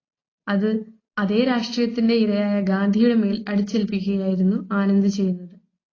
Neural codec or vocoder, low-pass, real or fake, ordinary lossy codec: none; 7.2 kHz; real; AAC, 32 kbps